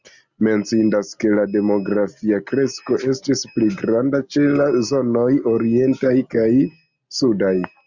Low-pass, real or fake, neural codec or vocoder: 7.2 kHz; real; none